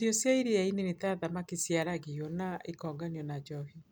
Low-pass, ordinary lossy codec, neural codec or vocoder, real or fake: none; none; none; real